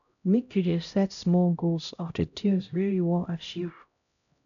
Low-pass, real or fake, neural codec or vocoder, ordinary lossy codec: 7.2 kHz; fake; codec, 16 kHz, 0.5 kbps, X-Codec, HuBERT features, trained on LibriSpeech; none